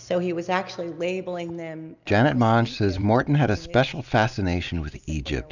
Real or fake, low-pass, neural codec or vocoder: real; 7.2 kHz; none